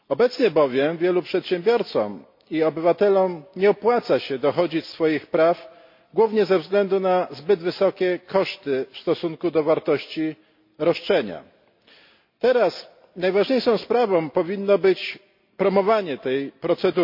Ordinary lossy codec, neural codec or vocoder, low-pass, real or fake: MP3, 32 kbps; none; 5.4 kHz; real